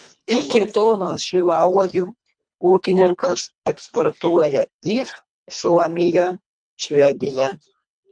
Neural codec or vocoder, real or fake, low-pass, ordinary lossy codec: codec, 24 kHz, 1.5 kbps, HILCodec; fake; 9.9 kHz; MP3, 64 kbps